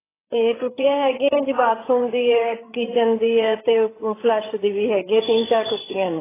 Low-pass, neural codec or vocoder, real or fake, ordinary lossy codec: 3.6 kHz; codec, 16 kHz, 8 kbps, FreqCodec, larger model; fake; AAC, 16 kbps